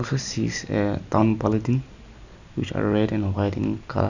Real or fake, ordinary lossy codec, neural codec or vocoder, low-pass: real; none; none; 7.2 kHz